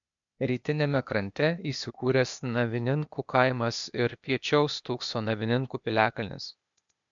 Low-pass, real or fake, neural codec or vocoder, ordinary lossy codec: 7.2 kHz; fake; codec, 16 kHz, 0.8 kbps, ZipCodec; MP3, 64 kbps